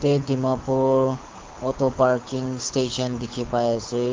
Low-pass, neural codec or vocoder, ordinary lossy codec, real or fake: 7.2 kHz; codec, 24 kHz, 6 kbps, HILCodec; Opus, 32 kbps; fake